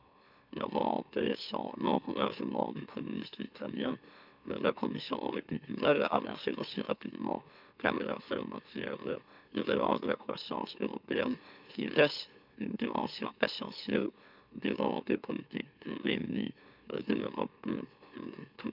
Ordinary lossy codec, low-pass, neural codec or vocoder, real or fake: none; 5.4 kHz; autoencoder, 44.1 kHz, a latent of 192 numbers a frame, MeloTTS; fake